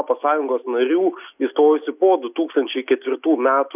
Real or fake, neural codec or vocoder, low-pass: real; none; 3.6 kHz